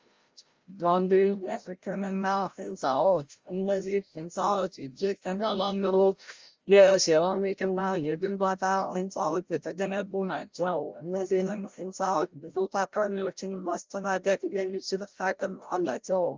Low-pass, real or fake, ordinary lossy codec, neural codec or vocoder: 7.2 kHz; fake; Opus, 32 kbps; codec, 16 kHz, 0.5 kbps, FreqCodec, larger model